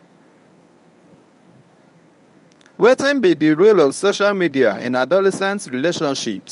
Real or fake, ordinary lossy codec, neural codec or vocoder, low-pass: fake; none; codec, 24 kHz, 0.9 kbps, WavTokenizer, medium speech release version 1; 10.8 kHz